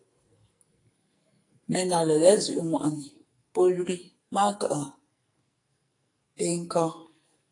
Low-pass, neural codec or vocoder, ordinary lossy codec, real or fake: 10.8 kHz; codec, 44.1 kHz, 2.6 kbps, SNAC; AAC, 48 kbps; fake